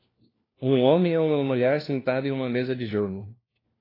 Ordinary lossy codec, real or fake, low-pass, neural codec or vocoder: AAC, 24 kbps; fake; 5.4 kHz; codec, 16 kHz, 1 kbps, FunCodec, trained on LibriTTS, 50 frames a second